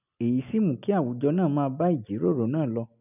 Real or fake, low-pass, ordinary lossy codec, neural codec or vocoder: real; 3.6 kHz; none; none